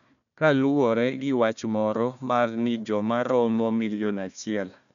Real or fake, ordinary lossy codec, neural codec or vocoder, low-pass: fake; none; codec, 16 kHz, 1 kbps, FunCodec, trained on Chinese and English, 50 frames a second; 7.2 kHz